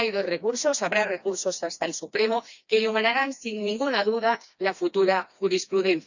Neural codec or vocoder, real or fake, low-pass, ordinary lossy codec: codec, 16 kHz, 2 kbps, FreqCodec, smaller model; fake; 7.2 kHz; none